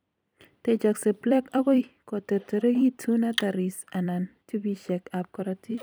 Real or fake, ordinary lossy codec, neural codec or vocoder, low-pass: fake; none; vocoder, 44.1 kHz, 128 mel bands every 256 samples, BigVGAN v2; none